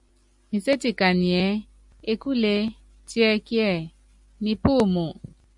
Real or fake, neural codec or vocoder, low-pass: real; none; 10.8 kHz